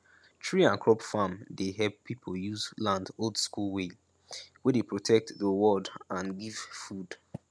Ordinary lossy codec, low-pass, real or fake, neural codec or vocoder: none; none; real; none